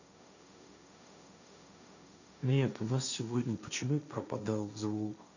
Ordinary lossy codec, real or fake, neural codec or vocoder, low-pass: none; fake; codec, 16 kHz, 1.1 kbps, Voila-Tokenizer; 7.2 kHz